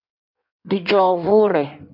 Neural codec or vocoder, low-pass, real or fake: codec, 16 kHz in and 24 kHz out, 1.1 kbps, FireRedTTS-2 codec; 5.4 kHz; fake